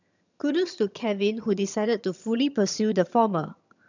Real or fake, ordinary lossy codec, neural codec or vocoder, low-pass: fake; none; vocoder, 22.05 kHz, 80 mel bands, HiFi-GAN; 7.2 kHz